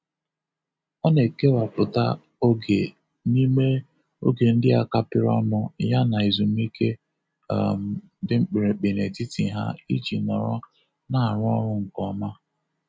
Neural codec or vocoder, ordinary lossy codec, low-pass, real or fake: none; none; none; real